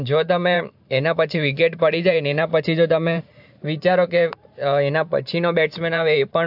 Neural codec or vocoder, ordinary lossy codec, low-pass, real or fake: vocoder, 44.1 kHz, 128 mel bands every 512 samples, BigVGAN v2; AAC, 48 kbps; 5.4 kHz; fake